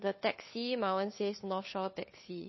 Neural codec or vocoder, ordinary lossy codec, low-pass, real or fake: codec, 16 kHz, 2 kbps, FunCodec, trained on Chinese and English, 25 frames a second; MP3, 24 kbps; 7.2 kHz; fake